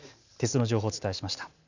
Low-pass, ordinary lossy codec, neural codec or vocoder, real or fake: 7.2 kHz; none; none; real